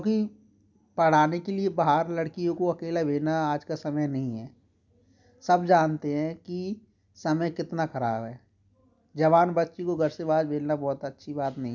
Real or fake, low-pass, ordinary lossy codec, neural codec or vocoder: real; 7.2 kHz; none; none